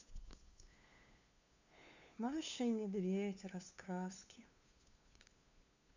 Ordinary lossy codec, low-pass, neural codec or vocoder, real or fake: Opus, 64 kbps; 7.2 kHz; codec, 16 kHz, 2 kbps, FunCodec, trained on LibriTTS, 25 frames a second; fake